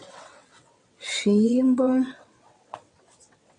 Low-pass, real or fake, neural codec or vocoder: 9.9 kHz; fake; vocoder, 22.05 kHz, 80 mel bands, WaveNeXt